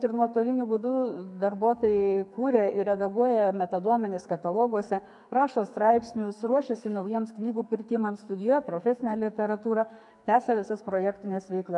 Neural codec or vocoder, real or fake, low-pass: codec, 44.1 kHz, 2.6 kbps, SNAC; fake; 10.8 kHz